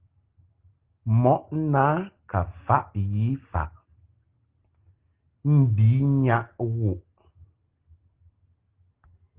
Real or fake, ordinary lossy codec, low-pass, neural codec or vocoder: real; Opus, 16 kbps; 3.6 kHz; none